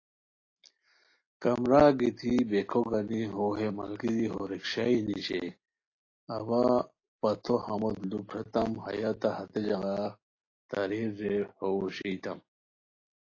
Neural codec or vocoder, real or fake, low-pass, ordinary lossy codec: none; real; 7.2 kHz; AAC, 32 kbps